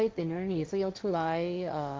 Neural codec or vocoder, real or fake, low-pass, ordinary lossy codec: codec, 16 kHz, 1.1 kbps, Voila-Tokenizer; fake; none; none